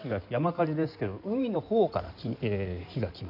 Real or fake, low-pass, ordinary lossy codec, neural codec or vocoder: fake; 5.4 kHz; none; codec, 16 kHz in and 24 kHz out, 2.2 kbps, FireRedTTS-2 codec